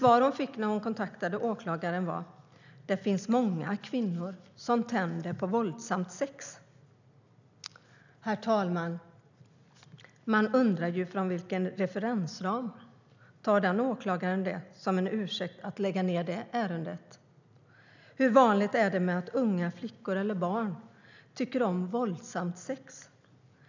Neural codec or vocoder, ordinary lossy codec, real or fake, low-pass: none; none; real; 7.2 kHz